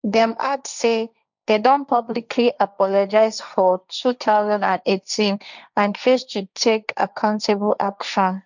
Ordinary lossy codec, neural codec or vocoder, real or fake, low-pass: none; codec, 16 kHz, 1.1 kbps, Voila-Tokenizer; fake; 7.2 kHz